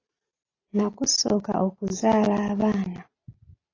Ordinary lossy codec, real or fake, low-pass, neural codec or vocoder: AAC, 32 kbps; real; 7.2 kHz; none